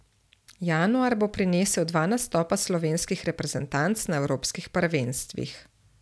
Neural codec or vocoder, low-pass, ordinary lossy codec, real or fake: none; none; none; real